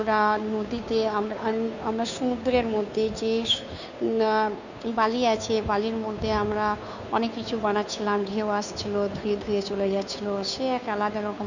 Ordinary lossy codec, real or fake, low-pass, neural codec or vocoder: none; fake; 7.2 kHz; codec, 16 kHz, 2 kbps, FunCodec, trained on Chinese and English, 25 frames a second